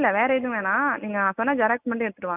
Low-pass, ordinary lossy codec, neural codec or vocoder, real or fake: 3.6 kHz; none; none; real